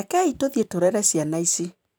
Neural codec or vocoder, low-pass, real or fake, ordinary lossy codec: vocoder, 44.1 kHz, 128 mel bands every 512 samples, BigVGAN v2; none; fake; none